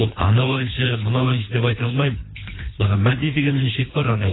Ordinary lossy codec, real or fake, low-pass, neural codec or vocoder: AAC, 16 kbps; fake; 7.2 kHz; codec, 24 kHz, 3 kbps, HILCodec